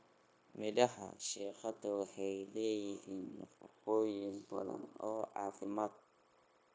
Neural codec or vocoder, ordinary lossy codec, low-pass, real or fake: codec, 16 kHz, 0.9 kbps, LongCat-Audio-Codec; none; none; fake